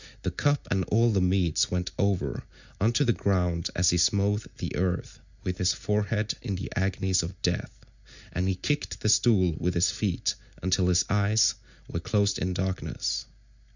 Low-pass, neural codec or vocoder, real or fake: 7.2 kHz; none; real